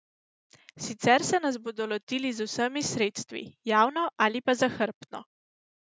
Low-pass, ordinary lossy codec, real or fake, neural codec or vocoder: none; none; real; none